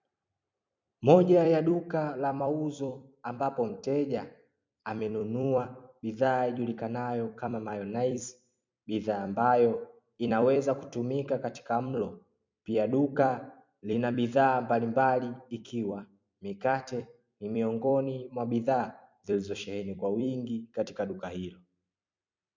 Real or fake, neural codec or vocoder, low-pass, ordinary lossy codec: fake; vocoder, 44.1 kHz, 128 mel bands every 256 samples, BigVGAN v2; 7.2 kHz; MP3, 64 kbps